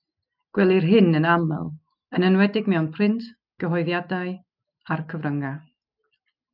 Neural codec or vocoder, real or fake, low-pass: none; real; 5.4 kHz